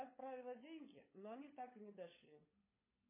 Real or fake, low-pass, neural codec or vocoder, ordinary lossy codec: fake; 3.6 kHz; codec, 16 kHz, 16 kbps, FunCodec, trained on LibriTTS, 50 frames a second; MP3, 16 kbps